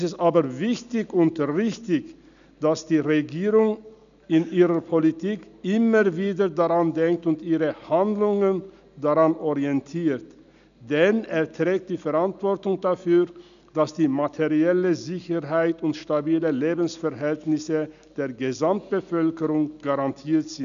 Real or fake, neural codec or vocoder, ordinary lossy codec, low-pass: real; none; none; 7.2 kHz